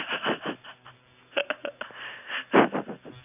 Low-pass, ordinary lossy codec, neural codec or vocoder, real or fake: 3.6 kHz; none; none; real